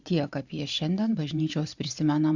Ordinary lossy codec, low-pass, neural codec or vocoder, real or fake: Opus, 64 kbps; 7.2 kHz; vocoder, 44.1 kHz, 128 mel bands every 512 samples, BigVGAN v2; fake